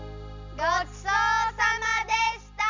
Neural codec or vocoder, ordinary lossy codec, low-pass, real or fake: none; MP3, 64 kbps; 7.2 kHz; real